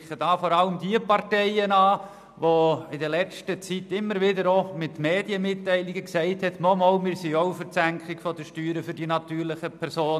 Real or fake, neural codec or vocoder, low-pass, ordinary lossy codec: real; none; 14.4 kHz; none